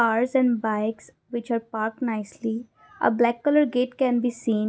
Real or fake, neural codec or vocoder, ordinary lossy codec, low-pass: real; none; none; none